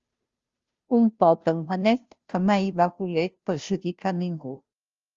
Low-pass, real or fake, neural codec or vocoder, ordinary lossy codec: 7.2 kHz; fake; codec, 16 kHz, 0.5 kbps, FunCodec, trained on Chinese and English, 25 frames a second; Opus, 32 kbps